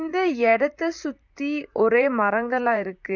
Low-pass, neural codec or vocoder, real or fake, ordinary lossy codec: 7.2 kHz; vocoder, 44.1 kHz, 128 mel bands, Pupu-Vocoder; fake; none